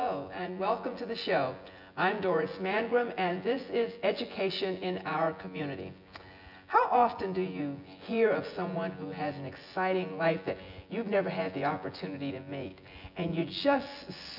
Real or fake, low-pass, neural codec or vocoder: fake; 5.4 kHz; vocoder, 24 kHz, 100 mel bands, Vocos